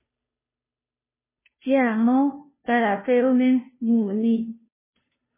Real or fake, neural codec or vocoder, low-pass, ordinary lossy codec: fake; codec, 16 kHz, 0.5 kbps, FunCodec, trained on Chinese and English, 25 frames a second; 3.6 kHz; MP3, 16 kbps